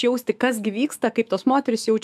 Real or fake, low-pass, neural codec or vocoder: fake; 14.4 kHz; autoencoder, 48 kHz, 128 numbers a frame, DAC-VAE, trained on Japanese speech